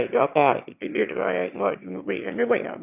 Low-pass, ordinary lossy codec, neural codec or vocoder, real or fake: 3.6 kHz; none; autoencoder, 22.05 kHz, a latent of 192 numbers a frame, VITS, trained on one speaker; fake